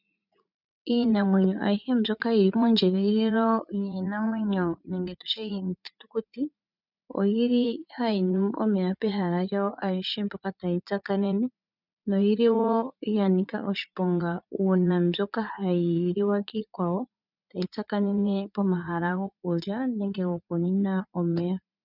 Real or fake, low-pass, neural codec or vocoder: fake; 5.4 kHz; vocoder, 44.1 kHz, 80 mel bands, Vocos